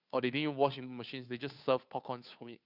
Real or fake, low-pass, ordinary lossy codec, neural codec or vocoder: fake; 5.4 kHz; none; codec, 16 kHz, 2 kbps, FunCodec, trained on Chinese and English, 25 frames a second